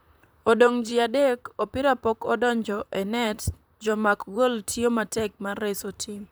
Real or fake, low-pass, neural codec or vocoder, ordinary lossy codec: fake; none; vocoder, 44.1 kHz, 128 mel bands, Pupu-Vocoder; none